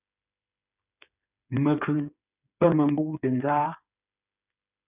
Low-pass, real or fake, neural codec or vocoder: 3.6 kHz; fake; codec, 16 kHz, 8 kbps, FreqCodec, smaller model